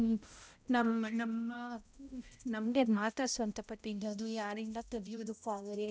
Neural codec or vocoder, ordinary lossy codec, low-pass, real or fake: codec, 16 kHz, 0.5 kbps, X-Codec, HuBERT features, trained on balanced general audio; none; none; fake